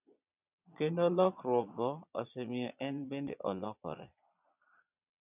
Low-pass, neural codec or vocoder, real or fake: 3.6 kHz; vocoder, 22.05 kHz, 80 mel bands, Vocos; fake